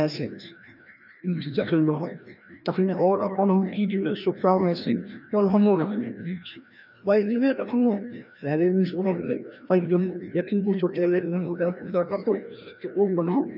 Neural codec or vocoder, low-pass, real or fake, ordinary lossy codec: codec, 16 kHz, 1 kbps, FreqCodec, larger model; 5.4 kHz; fake; none